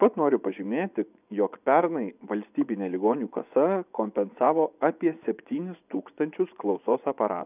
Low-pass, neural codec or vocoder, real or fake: 3.6 kHz; vocoder, 24 kHz, 100 mel bands, Vocos; fake